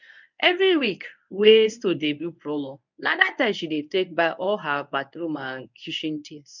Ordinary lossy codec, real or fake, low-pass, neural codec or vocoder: none; fake; 7.2 kHz; codec, 24 kHz, 0.9 kbps, WavTokenizer, medium speech release version 1